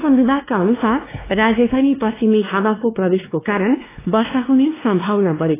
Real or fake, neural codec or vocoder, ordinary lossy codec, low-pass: fake; codec, 16 kHz, 2 kbps, X-Codec, WavLM features, trained on Multilingual LibriSpeech; AAC, 16 kbps; 3.6 kHz